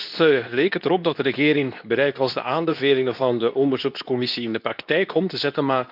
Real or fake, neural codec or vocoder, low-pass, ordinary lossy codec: fake; codec, 24 kHz, 0.9 kbps, WavTokenizer, medium speech release version 2; 5.4 kHz; none